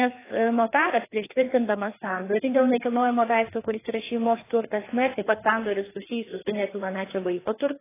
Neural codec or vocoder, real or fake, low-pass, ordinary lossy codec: codec, 16 kHz, 2 kbps, FreqCodec, larger model; fake; 3.6 kHz; AAC, 16 kbps